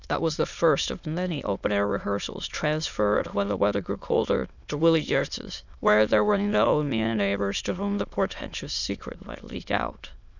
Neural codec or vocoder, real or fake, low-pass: autoencoder, 22.05 kHz, a latent of 192 numbers a frame, VITS, trained on many speakers; fake; 7.2 kHz